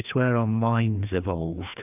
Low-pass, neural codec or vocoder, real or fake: 3.6 kHz; codec, 16 kHz, 2 kbps, FreqCodec, larger model; fake